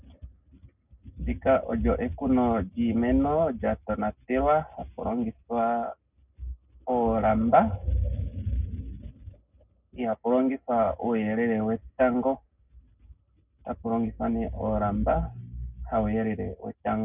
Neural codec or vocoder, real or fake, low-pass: none; real; 3.6 kHz